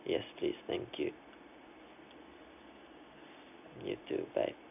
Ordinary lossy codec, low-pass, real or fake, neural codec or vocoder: none; 3.6 kHz; real; none